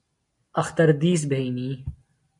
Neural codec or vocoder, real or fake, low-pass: none; real; 10.8 kHz